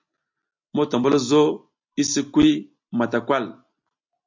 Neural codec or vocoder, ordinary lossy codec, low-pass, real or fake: none; MP3, 48 kbps; 7.2 kHz; real